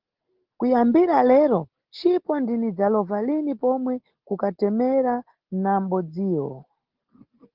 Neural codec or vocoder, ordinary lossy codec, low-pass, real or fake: none; Opus, 16 kbps; 5.4 kHz; real